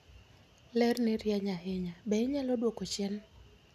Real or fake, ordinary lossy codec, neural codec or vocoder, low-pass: real; none; none; 14.4 kHz